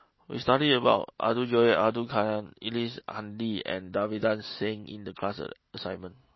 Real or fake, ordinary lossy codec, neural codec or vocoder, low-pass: real; MP3, 24 kbps; none; 7.2 kHz